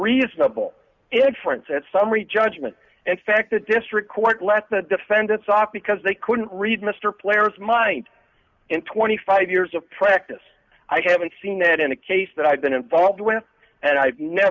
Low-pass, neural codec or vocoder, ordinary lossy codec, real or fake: 7.2 kHz; none; Opus, 64 kbps; real